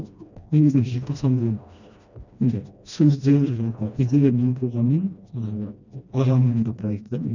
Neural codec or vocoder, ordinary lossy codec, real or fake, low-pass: codec, 16 kHz, 1 kbps, FreqCodec, smaller model; none; fake; 7.2 kHz